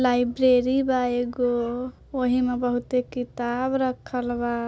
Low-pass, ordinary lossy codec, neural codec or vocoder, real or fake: none; none; none; real